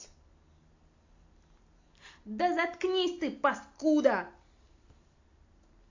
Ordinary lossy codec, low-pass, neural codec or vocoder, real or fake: AAC, 48 kbps; 7.2 kHz; none; real